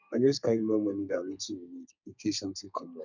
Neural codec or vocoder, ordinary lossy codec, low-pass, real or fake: codec, 32 kHz, 1.9 kbps, SNAC; none; 7.2 kHz; fake